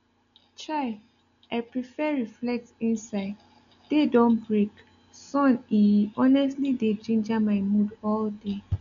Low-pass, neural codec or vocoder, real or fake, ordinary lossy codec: 7.2 kHz; none; real; none